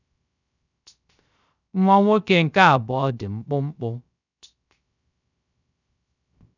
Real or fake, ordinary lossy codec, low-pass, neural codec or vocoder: fake; none; 7.2 kHz; codec, 16 kHz, 0.3 kbps, FocalCodec